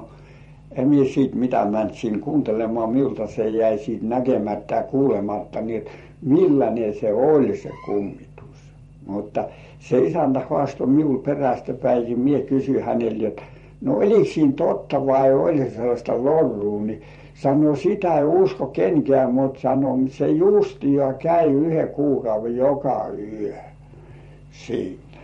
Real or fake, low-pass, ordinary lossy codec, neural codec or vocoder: fake; 19.8 kHz; MP3, 48 kbps; vocoder, 44.1 kHz, 128 mel bands every 512 samples, BigVGAN v2